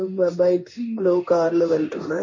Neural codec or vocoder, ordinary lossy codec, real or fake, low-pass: codec, 16 kHz in and 24 kHz out, 1 kbps, XY-Tokenizer; MP3, 32 kbps; fake; 7.2 kHz